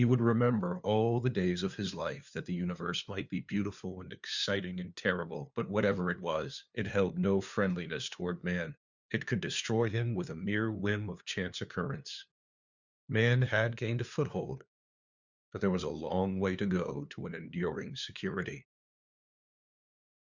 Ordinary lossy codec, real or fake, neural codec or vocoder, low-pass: Opus, 64 kbps; fake; codec, 16 kHz, 2 kbps, FunCodec, trained on LibriTTS, 25 frames a second; 7.2 kHz